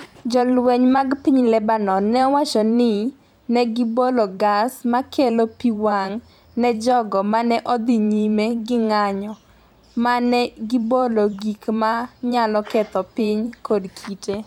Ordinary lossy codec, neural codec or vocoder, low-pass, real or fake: none; vocoder, 44.1 kHz, 128 mel bands every 512 samples, BigVGAN v2; 19.8 kHz; fake